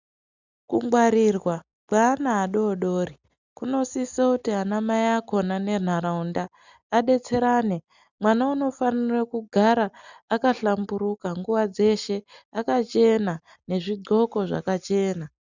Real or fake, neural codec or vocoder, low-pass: real; none; 7.2 kHz